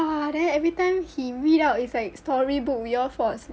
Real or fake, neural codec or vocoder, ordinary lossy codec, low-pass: real; none; none; none